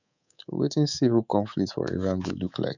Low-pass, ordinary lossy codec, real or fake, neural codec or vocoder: 7.2 kHz; none; fake; codec, 24 kHz, 3.1 kbps, DualCodec